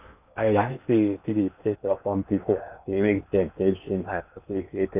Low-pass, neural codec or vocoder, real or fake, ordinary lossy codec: 3.6 kHz; codec, 16 kHz in and 24 kHz out, 0.8 kbps, FocalCodec, streaming, 65536 codes; fake; none